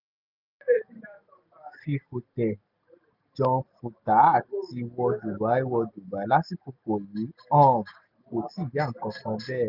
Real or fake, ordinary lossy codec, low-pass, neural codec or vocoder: real; none; 5.4 kHz; none